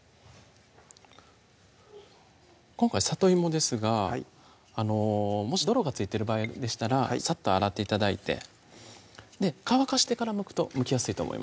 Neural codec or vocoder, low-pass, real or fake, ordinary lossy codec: none; none; real; none